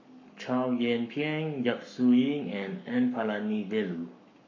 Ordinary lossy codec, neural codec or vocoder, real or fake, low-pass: MP3, 48 kbps; codec, 44.1 kHz, 7.8 kbps, Pupu-Codec; fake; 7.2 kHz